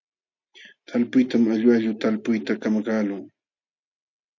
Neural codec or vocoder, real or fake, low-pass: none; real; 7.2 kHz